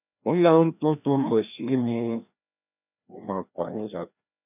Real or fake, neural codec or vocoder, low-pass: fake; codec, 16 kHz, 1 kbps, FreqCodec, larger model; 3.6 kHz